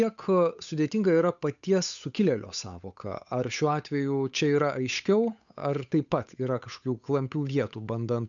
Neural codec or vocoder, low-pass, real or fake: codec, 16 kHz, 8 kbps, FunCodec, trained on Chinese and English, 25 frames a second; 7.2 kHz; fake